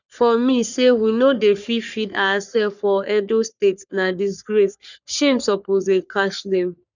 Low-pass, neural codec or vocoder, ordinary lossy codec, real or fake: 7.2 kHz; codec, 44.1 kHz, 3.4 kbps, Pupu-Codec; none; fake